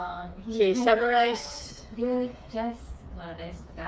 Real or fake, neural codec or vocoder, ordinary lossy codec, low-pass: fake; codec, 16 kHz, 4 kbps, FreqCodec, smaller model; none; none